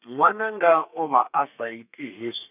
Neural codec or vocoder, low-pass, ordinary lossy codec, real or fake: codec, 32 kHz, 1.9 kbps, SNAC; 3.6 kHz; none; fake